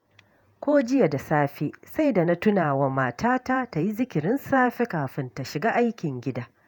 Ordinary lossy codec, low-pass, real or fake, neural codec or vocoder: none; 19.8 kHz; fake; vocoder, 48 kHz, 128 mel bands, Vocos